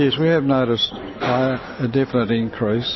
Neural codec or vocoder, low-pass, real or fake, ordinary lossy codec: none; 7.2 kHz; real; MP3, 24 kbps